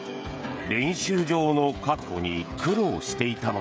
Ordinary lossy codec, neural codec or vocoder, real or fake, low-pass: none; codec, 16 kHz, 16 kbps, FreqCodec, smaller model; fake; none